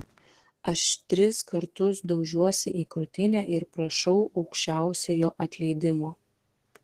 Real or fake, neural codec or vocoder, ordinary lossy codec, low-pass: fake; codec, 32 kHz, 1.9 kbps, SNAC; Opus, 16 kbps; 14.4 kHz